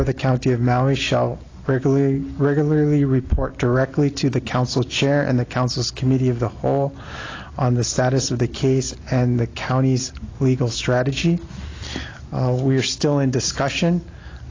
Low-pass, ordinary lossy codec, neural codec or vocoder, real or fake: 7.2 kHz; AAC, 32 kbps; none; real